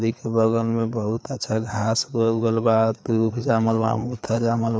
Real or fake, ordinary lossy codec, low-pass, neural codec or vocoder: fake; none; none; codec, 16 kHz, 4 kbps, FreqCodec, larger model